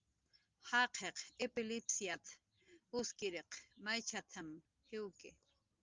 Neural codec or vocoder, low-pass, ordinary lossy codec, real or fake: none; 7.2 kHz; Opus, 32 kbps; real